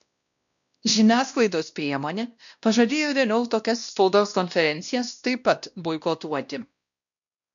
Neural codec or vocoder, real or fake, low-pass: codec, 16 kHz, 1 kbps, X-Codec, WavLM features, trained on Multilingual LibriSpeech; fake; 7.2 kHz